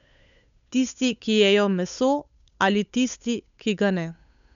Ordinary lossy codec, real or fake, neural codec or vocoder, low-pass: none; fake; codec, 16 kHz, 8 kbps, FunCodec, trained on Chinese and English, 25 frames a second; 7.2 kHz